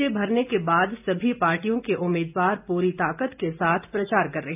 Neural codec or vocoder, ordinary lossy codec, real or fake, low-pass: none; AAC, 32 kbps; real; 3.6 kHz